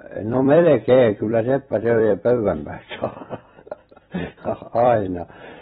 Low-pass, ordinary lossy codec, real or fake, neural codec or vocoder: 19.8 kHz; AAC, 16 kbps; fake; vocoder, 44.1 kHz, 128 mel bands every 256 samples, BigVGAN v2